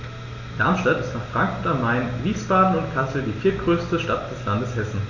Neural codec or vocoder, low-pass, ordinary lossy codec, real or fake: none; 7.2 kHz; none; real